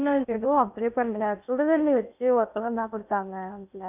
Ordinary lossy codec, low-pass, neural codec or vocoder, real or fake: none; 3.6 kHz; codec, 16 kHz in and 24 kHz out, 0.6 kbps, FocalCodec, streaming, 2048 codes; fake